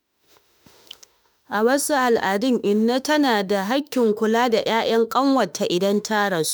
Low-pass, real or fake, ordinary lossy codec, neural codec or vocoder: none; fake; none; autoencoder, 48 kHz, 32 numbers a frame, DAC-VAE, trained on Japanese speech